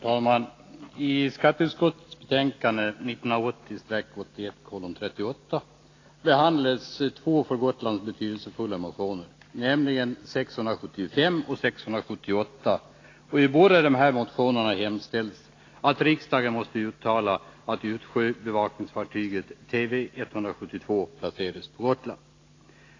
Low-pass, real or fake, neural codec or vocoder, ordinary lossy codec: 7.2 kHz; real; none; AAC, 32 kbps